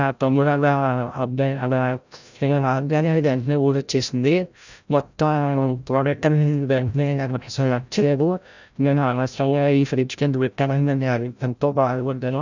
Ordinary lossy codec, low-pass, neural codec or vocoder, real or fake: none; 7.2 kHz; codec, 16 kHz, 0.5 kbps, FreqCodec, larger model; fake